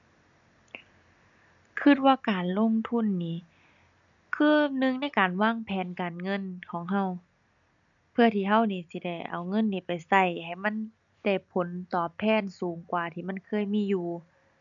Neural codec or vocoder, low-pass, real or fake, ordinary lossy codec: none; 7.2 kHz; real; none